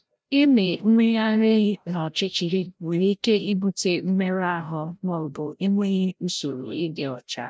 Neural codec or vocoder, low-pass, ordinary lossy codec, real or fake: codec, 16 kHz, 0.5 kbps, FreqCodec, larger model; none; none; fake